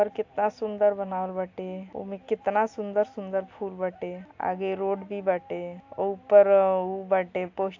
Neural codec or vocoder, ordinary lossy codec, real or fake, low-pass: none; AAC, 48 kbps; real; 7.2 kHz